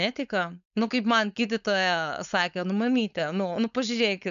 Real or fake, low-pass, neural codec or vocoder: fake; 7.2 kHz; codec, 16 kHz, 4.8 kbps, FACodec